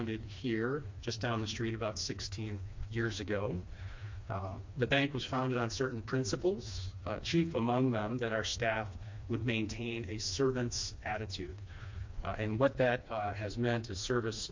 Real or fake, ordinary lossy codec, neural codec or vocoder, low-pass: fake; MP3, 48 kbps; codec, 16 kHz, 2 kbps, FreqCodec, smaller model; 7.2 kHz